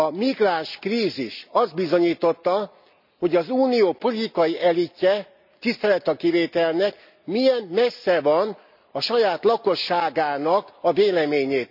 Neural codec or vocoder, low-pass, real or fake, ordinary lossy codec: none; 5.4 kHz; real; none